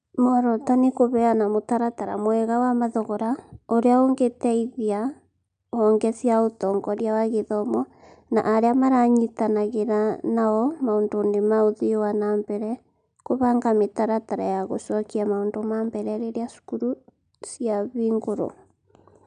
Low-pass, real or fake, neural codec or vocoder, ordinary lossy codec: 9.9 kHz; real; none; AAC, 96 kbps